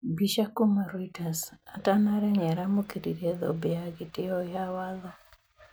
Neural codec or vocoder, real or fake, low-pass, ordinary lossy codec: none; real; none; none